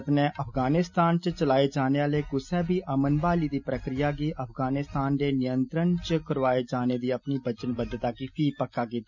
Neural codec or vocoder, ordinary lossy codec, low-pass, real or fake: none; none; 7.2 kHz; real